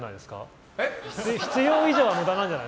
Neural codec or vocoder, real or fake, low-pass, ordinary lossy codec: none; real; none; none